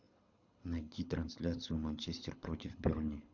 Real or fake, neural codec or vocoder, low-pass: fake; codec, 24 kHz, 6 kbps, HILCodec; 7.2 kHz